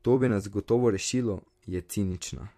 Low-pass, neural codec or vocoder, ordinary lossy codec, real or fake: 14.4 kHz; vocoder, 44.1 kHz, 128 mel bands every 256 samples, BigVGAN v2; MP3, 64 kbps; fake